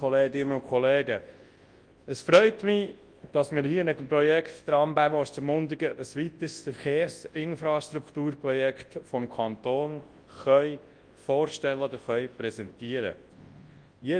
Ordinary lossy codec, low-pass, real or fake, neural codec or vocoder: Opus, 24 kbps; 9.9 kHz; fake; codec, 24 kHz, 0.9 kbps, WavTokenizer, large speech release